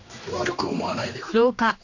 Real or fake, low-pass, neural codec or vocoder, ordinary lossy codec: fake; 7.2 kHz; codec, 16 kHz, 2 kbps, X-Codec, HuBERT features, trained on general audio; none